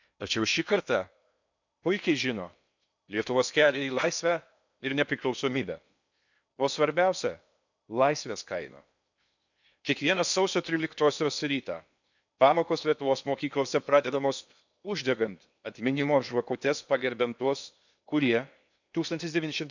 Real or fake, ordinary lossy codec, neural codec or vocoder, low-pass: fake; none; codec, 16 kHz in and 24 kHz out, 0.8 kbps, FocalCodec, streaming, 65536 codes; 7.2 kHz